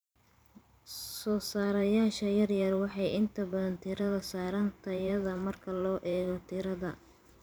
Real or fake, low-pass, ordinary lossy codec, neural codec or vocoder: fake; none; none; vocoder, 44.1 kHz, 128 mel bands every 512 samples, BigVGAN v2